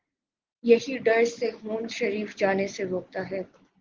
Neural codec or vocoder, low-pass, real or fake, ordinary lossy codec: none; 7.2 kHz; real; Opus, 16 kbps